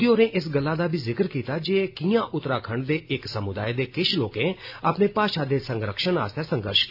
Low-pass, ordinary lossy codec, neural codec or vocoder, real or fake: 5.4 kHz; AAC, 48 kbps; none; real